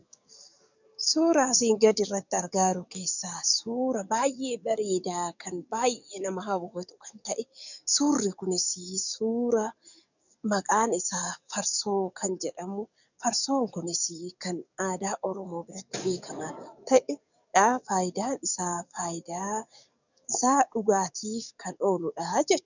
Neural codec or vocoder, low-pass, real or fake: codec, 44.1 kHz, 7.8 kbps, DAC; 7.2 kHz; fake